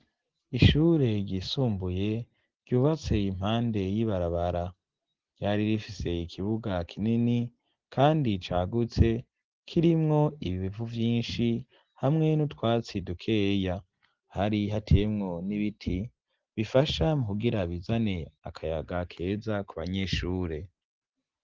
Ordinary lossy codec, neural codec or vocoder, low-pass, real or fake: Opus, 16 kbps; none; 7.2 kHz; real